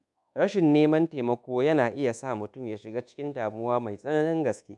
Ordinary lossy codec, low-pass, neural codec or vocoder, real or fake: none; 10.8 kHz; codec, 24 kHz, 1.2 kbps, DualCodec; fake